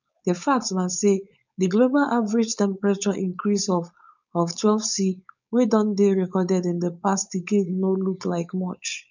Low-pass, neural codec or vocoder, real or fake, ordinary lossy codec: 7.2 kHz; codec, 16 kHz, 4.8 kbps, FACodec; fake; none